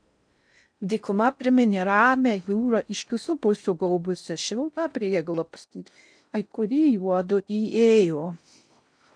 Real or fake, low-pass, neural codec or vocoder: fake; 9.9 kHz; codec, 16 kHz in and 24 kHz out, 0.6 kbps, FocalCodec, streaming, 4096 codes